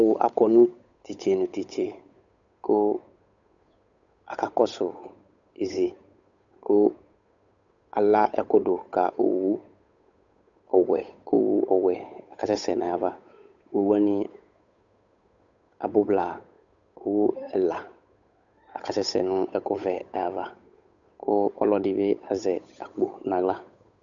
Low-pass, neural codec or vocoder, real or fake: 7.2 kHz; codec, 16 kHz, 8 kbps, FunCodec, trained on Chinese and English, 25 frames a second; fake